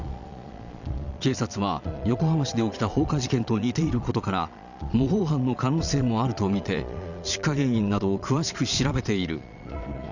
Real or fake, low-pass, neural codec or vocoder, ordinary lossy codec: fake; 7.2 kHz; vocoder, 22.05 kHz, 80 mel bands, Vocos; none